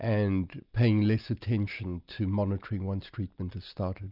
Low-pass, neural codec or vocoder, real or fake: 5.4 kHz; none; real